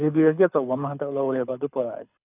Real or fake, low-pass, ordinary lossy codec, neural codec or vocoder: fake; 3.6 kHz; none; codec, 24 kHz, 6 kbps, HILCodec